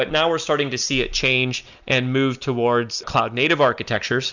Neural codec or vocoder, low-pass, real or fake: none; 7.2 kHz; real